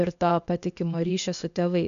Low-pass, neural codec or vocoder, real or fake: 7.2 kHz; codec, 16 kHz, 0.8 kbps, ZipCodec; fake